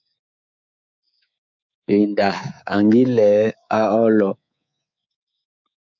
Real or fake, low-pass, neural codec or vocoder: fake; 7.2 kHz; codec, 24 kHz, 3.1 kbps, DualCodec